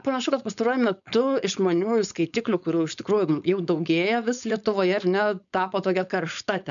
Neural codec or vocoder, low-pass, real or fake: codec, 16 kHz, 4.8 kbps, FACodec; 7.2 kHz; fake